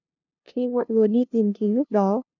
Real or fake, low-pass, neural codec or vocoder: fake; 7.2 kHz; codec, 16 kHz, 0.5 kbps, FunCodec, trained on LibriTTS, 25 frames a second